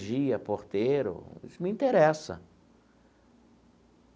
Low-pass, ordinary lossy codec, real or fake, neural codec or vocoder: none; none; real; none